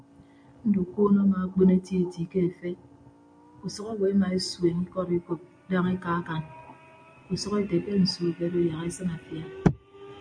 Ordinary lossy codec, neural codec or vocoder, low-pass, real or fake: MP3, 64 kbps; none; 9.9 kHz; real